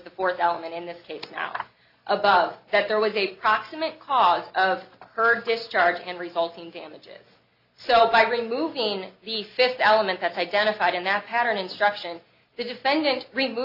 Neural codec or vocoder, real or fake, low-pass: none; real; 5.4 kHz